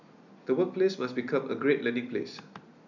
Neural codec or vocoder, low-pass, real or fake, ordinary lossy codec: none; 7.2 kHz; real; none